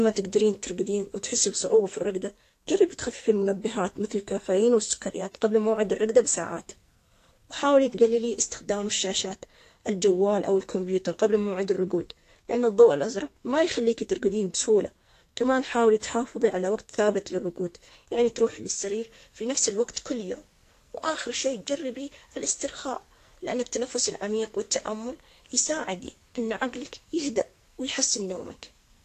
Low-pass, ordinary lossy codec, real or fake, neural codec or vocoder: 14.4 kHz; AAC, 64 kbps; fake; codec, 44.1 kHz, 2.6 kbps, SNAC